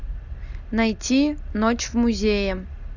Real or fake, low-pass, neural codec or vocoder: real; 7.2 kHz; none